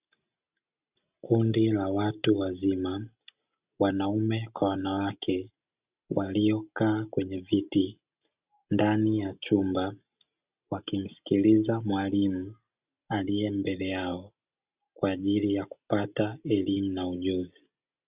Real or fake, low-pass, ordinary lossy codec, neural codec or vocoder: real; 3.6 kHz; Opus, 64 kbps; none